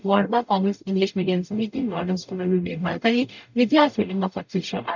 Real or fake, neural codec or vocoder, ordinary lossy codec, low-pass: fake; codec, 44.1 kHz, 0.9 kbps, DAC; none; 7.2 kHz